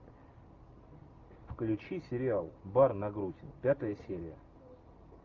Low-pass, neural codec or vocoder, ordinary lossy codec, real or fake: 7.2 kHz; none; Opus, 24 kbps; real